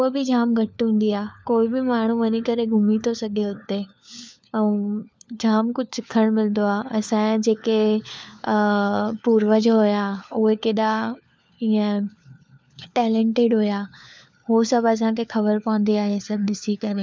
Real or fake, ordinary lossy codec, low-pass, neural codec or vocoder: fake; none; none; codec, 16 kHz, 4 kbps, FunCodec, trained on LibriTTS, 50 frames a second